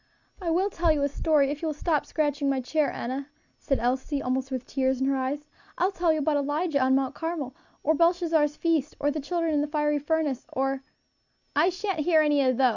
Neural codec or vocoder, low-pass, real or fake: none; 7.2 kHz; real